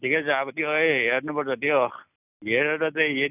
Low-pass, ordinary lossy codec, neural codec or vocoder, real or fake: 3.6 kHz; none; none; real